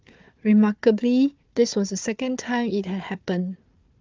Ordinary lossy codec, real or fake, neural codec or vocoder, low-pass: Opus, 32 kbps; fake; codec, 16 kHz, 4 kbps, FunCodec, trained on Chinese and English, 50 frames a second; 7.2 kHz